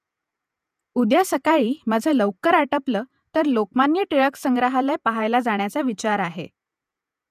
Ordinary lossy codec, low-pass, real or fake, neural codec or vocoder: none; 14.4 kHz; fake; vocoder, 48 kHz, 128 mel bands, Vocos